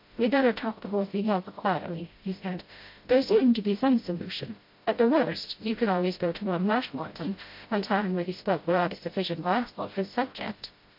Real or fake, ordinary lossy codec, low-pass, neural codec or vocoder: fake; MP3, 32 kbps; 5.4 kHz; codec, 16 kHz, 0.5 kbps, FreqCodec, smaller model